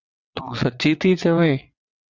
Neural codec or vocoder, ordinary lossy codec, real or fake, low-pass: vocoder, 22.05 kHz, 80 mel bands, WaveNeXt; Opus, 64 kbps; fake; 7.2 kHz